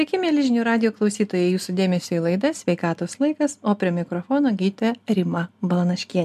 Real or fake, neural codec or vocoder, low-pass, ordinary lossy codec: real; none; 14.4 kHz; MP3, 96 kbps